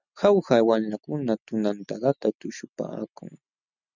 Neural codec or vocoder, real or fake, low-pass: none; real; 7.2 kHz